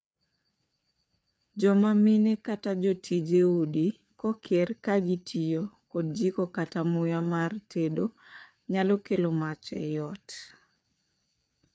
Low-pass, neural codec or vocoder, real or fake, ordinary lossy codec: none; codec, 16 kHz, 4 kbps, FreqCodec, larger model; fake; none